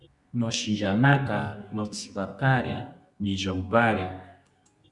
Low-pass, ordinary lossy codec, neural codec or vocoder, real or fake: 10.8 kHz; Opus, 64 kbps; codec, 24 kHz, 0.9 kbps, WavTokenizer, medium music audio release; fake